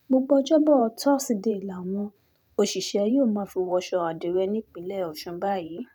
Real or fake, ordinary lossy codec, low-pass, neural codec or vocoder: fake; none; 19.8 kHz; vocoder, 44.1 kHz, 128 mel bands every 512 samples, BigVGAN v2